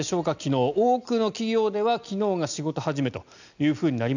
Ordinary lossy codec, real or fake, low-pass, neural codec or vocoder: none; real; 7.2 kHz; none